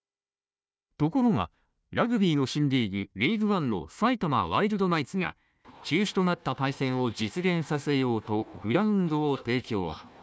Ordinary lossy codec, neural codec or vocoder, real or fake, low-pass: none; codec, 16 kHz, 1 kbps, FunCodec, trained on Chinese and English, 50 frames a second; fake; none